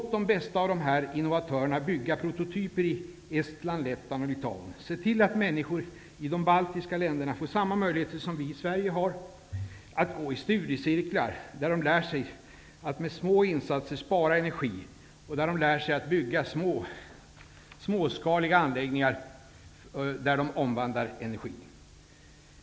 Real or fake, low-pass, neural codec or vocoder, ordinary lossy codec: real; none; none; none